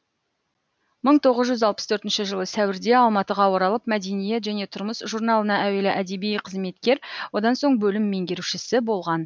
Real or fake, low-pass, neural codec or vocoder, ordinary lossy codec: real; none; none; none